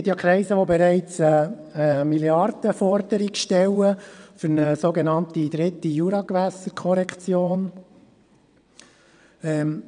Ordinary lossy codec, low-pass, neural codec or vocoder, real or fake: none; 9.9 kHz; vocoder, 22.05 kHz, 80 mel bands, WaveNeXt; fake